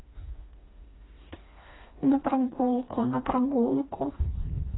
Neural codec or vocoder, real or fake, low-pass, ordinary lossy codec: codec, 16 kHz, 2 kbps, FreqCodec, smaller model; fake; 7.2 kHz; AAC, 16 kbps